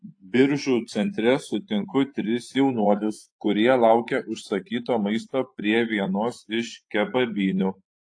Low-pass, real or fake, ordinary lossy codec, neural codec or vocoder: 9.9 kHz; fake; AAC, 48 kbps; vocoder, 24 kHz, 100 mel bands, Vocos